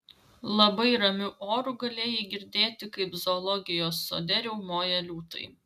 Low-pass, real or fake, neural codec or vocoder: 14.4 kHz; real; none